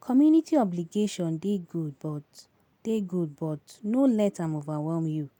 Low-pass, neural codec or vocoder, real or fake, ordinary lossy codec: 19.8 kHz; none; real; none